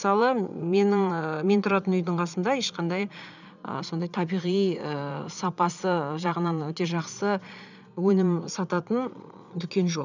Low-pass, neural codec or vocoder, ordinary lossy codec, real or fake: 7.2 kHz; vocoder, 22.05 kHz, 80 mel bands, Vocos; none; fake